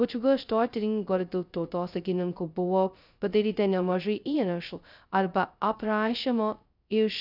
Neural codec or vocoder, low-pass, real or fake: codec, 16 kHz, 0.2 kbps, FocalCodec; 5.4 kHz; fake